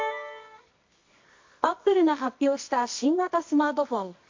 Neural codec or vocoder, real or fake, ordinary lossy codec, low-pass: codec, 24 kHz, 0.9 kbps, WavTokenizer, medium music audio release; fake; MP3, 48 kbps; 7.2 kHz